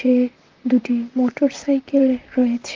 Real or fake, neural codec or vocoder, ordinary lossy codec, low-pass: fake; vocoder, 44.1 kHz, 128 mel bands, Pupu-Vocoder; Opus, 24 kbps; 7.2 kHz